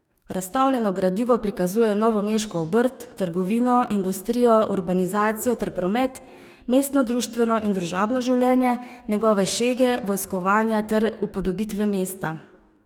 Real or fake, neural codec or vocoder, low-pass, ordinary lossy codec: fake; codec, 44.1 kHz, 2.6 kbps, DAC; 19.8 kHz; none